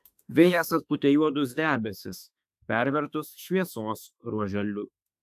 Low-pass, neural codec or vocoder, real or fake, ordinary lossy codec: 14.4 kHz; autoencoder, 48 kHz, 32 numbers a frame, DAC-VAE, trained on Japanese speech; fake; AAC, 96 kbps